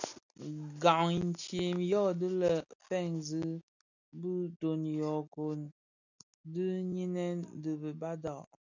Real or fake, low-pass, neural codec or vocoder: real; 7.2 kHz; none